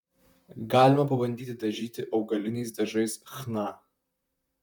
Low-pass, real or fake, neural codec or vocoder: 19.8 kHz; fake; vocoder, 44.1 kHz, 128 mel bands, Pupu-Vocoder